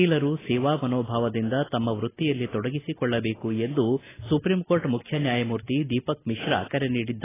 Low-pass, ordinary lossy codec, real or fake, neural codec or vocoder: 3.6 kHz; AAC, 16 kbps; real; none